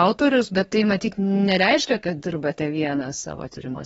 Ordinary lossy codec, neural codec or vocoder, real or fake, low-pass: AAC, 24 kbps; codec, 32 kHz, 1.9 kbps, SNAC; fake; 14.4 kHz